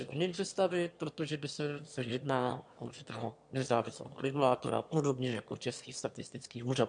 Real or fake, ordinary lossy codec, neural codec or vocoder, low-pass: fake; MP3, 64 kbps; autoencoder, 22.05 kHz, a latent of 192 numbers a frame, VITS, trained on one speaker; 9.9 kHz